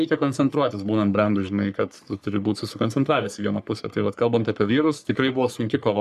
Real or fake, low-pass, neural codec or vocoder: fake; 14.4 kHz; codec, 44.1 kHz, 3.4 kbps, Pupu-Codec